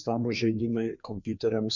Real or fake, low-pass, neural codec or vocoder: fake; 7.2 kHz; codec, 16 kHz, 2 kbps, FreqCodec, larger model